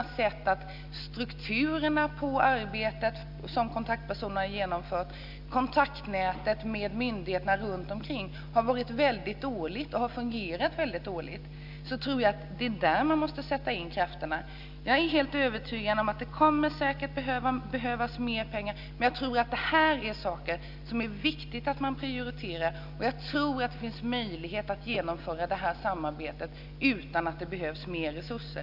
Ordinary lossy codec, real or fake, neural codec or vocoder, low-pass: none; real; none; 5.4 kHz